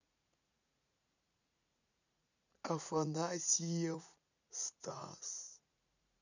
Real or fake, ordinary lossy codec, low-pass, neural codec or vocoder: fake; none; 7.2 kHz; vocoder, 44.1 kHz, 80 mel bands, Vocos